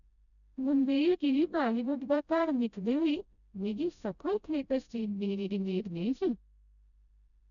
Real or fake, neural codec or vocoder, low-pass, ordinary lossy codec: fake; codec, 16 kHz, 0.5 kbps, FreqCodec, smaller model; 7.2 kHz; none